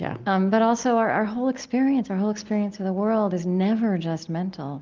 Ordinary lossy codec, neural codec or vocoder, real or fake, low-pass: Opus, 32 kbps; none; real; 7.2 kHz